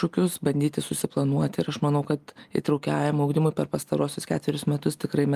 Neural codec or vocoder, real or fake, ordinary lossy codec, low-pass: none; real; Opus, 32 kbps; 14.4 kHz